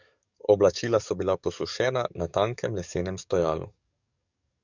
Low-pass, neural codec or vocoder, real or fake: 7.2 kHz; codec, 44.1 kHz, 7.8 kbps, DAC; fake